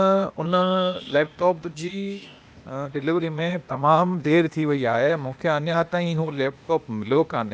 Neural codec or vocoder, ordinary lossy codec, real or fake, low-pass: codec, 16 kHz, 0.8 kbps, ZipCodec; none; fake; none